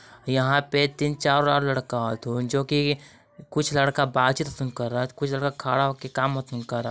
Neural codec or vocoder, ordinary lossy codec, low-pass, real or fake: none; none; none; real